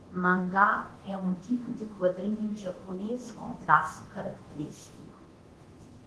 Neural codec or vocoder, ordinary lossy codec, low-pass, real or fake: codec, 24 kHz, 0.9 kbps, DualCodec; Opus, 16 kbps; 10.8 kHz; fake